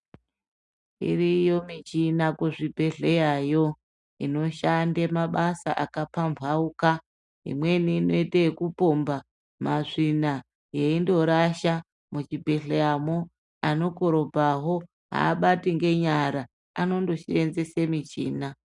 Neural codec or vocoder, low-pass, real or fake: none; 10.8 kHz; real